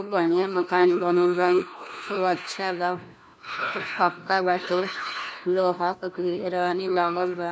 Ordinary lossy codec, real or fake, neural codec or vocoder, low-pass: none; fake; codec, 16 kHz, 1 kbps, FunCodec, trained on LibriTTS, 50 frames a second; none